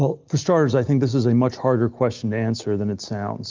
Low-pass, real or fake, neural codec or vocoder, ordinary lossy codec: 7.2 kHz; real; none; Opus, 24 kbps